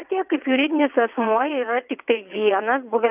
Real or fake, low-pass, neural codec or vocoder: fake; 3.6 kHz; vocoder, 22.05 kHz, 80 mel bands, WaveNeXt